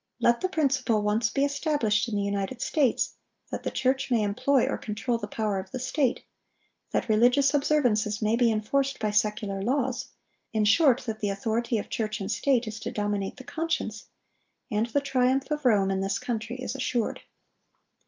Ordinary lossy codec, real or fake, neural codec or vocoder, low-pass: Opus, 24 kbps; real; none; 7.2 kHz